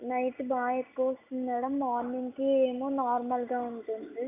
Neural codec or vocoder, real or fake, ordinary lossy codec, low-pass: none; real; AAC, 24 kbps; 3.6 kHz